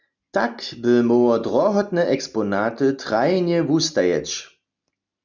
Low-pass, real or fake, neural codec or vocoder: 7.2 kHz; real; none